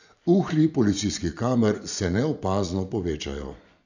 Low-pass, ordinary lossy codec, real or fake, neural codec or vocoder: 7.2 kHz; none; fake; vocoder, 24 kHz, 100 mel bands, Vocos